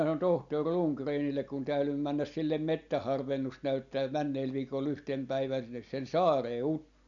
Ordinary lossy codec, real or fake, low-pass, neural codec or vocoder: none; real; 7.2 kHz; none